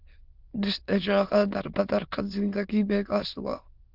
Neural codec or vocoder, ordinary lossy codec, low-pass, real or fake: autoencoder, 22.05 kHz, a latent of 192 numbers a frame, VITS, trained on many speakers; Opus, 24 kbps; 5.4 kHz; fake